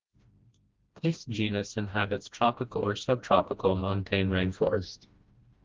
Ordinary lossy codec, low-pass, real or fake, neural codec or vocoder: Opus, 24 kbps; 7.2 kHz; fake; codec, 16 kHz, 1 kbps, FreqCodec, smaller model